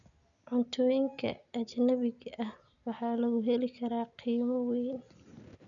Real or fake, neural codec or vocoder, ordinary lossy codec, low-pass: real; none; none; 7.2 kHz